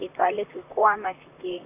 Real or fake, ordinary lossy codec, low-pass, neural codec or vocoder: fake; none; 3.6 kHz; vocoder, 44.1 kHz, 128 mel bands, Pupu-Vocoder